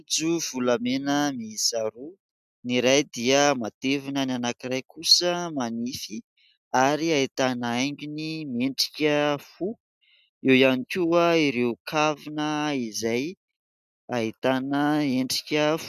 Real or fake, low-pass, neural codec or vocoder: real; 14.4 kHz; none